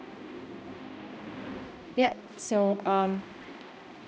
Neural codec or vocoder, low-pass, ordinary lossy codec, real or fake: codec, 16 kHz, 1 kbps, X-Codec, HuBERT features, trained on balanced general audio; none; none; fake